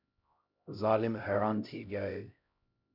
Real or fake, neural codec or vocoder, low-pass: fake; codec, 16 kHz, 0.5 kbps, X-Codec, HuBERT features, trained on LibriSpeech; 5.4 kHz